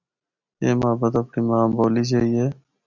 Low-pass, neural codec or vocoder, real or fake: 7.2 kHz; none; real